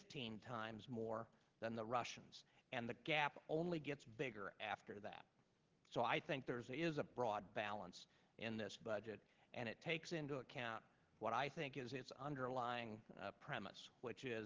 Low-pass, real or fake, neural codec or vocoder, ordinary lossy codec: 7.2 kHz; real; none; Opus, 16 kbps